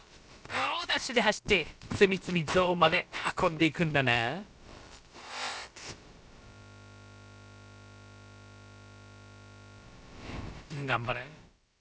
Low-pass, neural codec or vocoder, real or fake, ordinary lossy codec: none; codec, 16 kHz, about 1 kbps, DyCAST, with the encoder's durations; fake; none